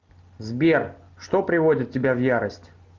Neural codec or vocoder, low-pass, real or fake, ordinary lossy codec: none; 7.2 kHz; real; Opus, 32 kbps